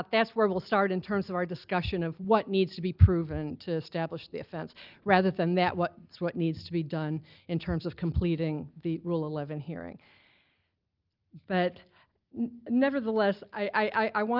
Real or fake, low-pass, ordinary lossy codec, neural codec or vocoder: real; 5.4 kHz; Opus, 24 kbps; none